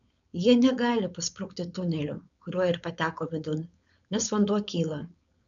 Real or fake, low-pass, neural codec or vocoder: fake; 7.2 kHz; codec, 16 kHz, 4.8 kbps, FACodec